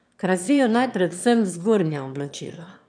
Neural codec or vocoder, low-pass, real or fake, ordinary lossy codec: autoencoder, 22.05 kHz, a latent of 192 numbers a frame, VITS, trained on one speaker; 9.9 kHz; fake; none